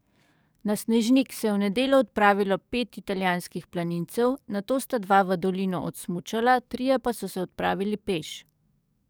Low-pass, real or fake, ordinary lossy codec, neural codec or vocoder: none; fake; none; codec, 44.1 kHz, 7.8 kbps, DAC